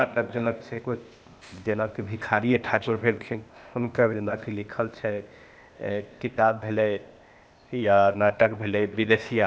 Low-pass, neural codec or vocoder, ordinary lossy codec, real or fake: none; codec, 16 kHz, 0.8 kbps, ZipCodec; none; fake